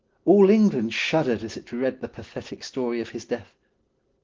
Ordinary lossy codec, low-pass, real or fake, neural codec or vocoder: Opus, 16 kbps; 7.2 kHz; real; none